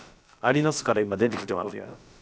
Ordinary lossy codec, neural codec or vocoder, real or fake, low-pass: none; codec, 16 kHz, about 1 kbps, DyCAST, with the encoder's durations; fake; none